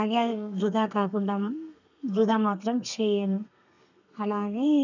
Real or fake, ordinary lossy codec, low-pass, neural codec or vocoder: fake; none; 7.2 kHz; codec, 32 kHz, 1.9 kbps, SNAC